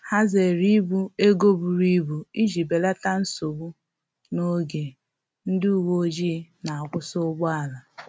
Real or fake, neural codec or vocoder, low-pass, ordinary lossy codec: real; none; none; none